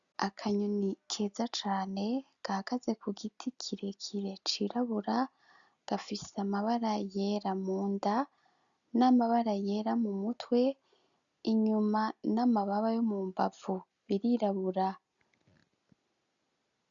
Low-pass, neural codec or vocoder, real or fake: 7.2 kHz; none; real